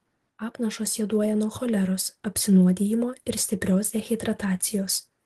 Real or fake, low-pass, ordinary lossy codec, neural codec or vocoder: real; 14.4 kHz; Opus, 16 kbps; none